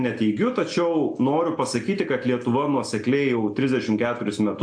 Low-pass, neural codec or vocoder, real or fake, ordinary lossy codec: 9.9 kHz; none; real; AAC, 64 kbps